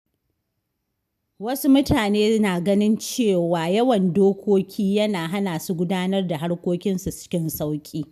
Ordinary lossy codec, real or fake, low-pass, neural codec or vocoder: none; real; 14.4 kHz; none